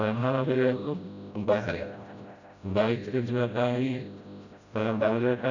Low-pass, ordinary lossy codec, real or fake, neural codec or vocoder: 7.2 kHz; none; fake; codec, 16 kHz, 0.5 kbps, FreqCodec, smaller model